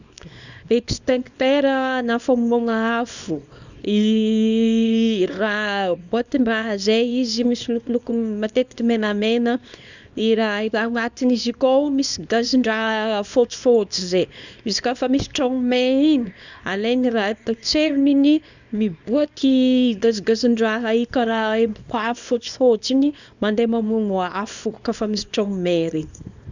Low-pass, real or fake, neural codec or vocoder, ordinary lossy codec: 7.2 kHz; fake; codec, 24 kHz, 0.9 kbps, WavTokenizer, small release; none